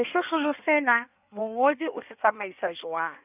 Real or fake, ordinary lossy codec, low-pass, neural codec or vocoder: fake; none; 3.6 kHz; codec, 16 kHz in and 24 kHz out, 1.1 kbps, FireRedTTS-2 codec